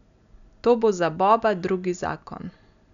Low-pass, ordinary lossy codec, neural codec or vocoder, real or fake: 7.2 kHz; none; none; real